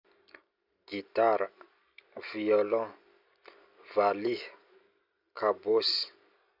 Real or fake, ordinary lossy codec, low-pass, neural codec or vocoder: real; none; 5.4 kHz; none